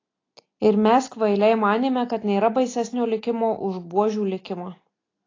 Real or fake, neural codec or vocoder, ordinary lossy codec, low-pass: real; none; AAC, 32 kbps; 7.2 kHz